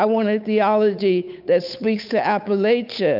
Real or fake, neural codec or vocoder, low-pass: fake; codec, 16 kHz, 8 kbps, FunCodec, trained on Chinese and English, 25 frames a second; 5.4 kHz